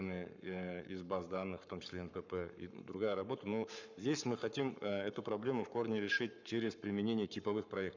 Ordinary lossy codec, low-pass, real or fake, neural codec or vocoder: none; 7.2 kHz; fake; codec, 44.1 kHz, 7.8 kbps, Pupu-Codec